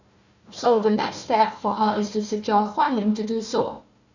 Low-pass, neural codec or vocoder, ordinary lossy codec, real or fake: 7.2 kHz; codec, 16 kHz, 1 kbps, FunCodec, trained on Chinese and English, 50 frames a second; none; fake